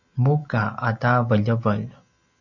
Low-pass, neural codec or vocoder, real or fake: 7.2 kHz; none; real